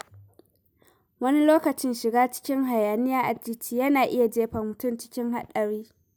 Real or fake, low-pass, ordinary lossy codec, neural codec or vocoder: real; none; none; none